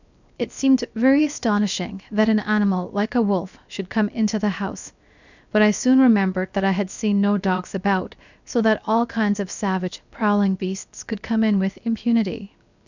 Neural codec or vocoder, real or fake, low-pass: codec, 16 kHz, 0.7 kbps, FocalCodec; fake; 7.2 kHz